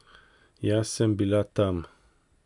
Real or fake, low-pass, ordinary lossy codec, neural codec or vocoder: real; 10.8 kHz; none; none